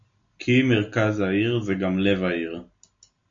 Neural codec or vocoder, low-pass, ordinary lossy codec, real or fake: none; 7.2 kHz; AAC, 48 kbps; real